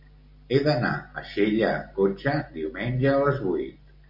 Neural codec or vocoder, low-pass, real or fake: none; 5.4 kHz; real